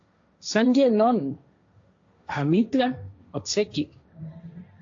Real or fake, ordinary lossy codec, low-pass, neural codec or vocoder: fake; AAC, 48 kbps; 7.2 kHz; codec, 16 kHz, 1.1 kbps, Voila-Tokenizer